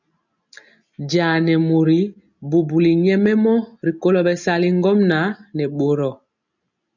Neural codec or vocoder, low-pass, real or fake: none; 7.2 kHz; real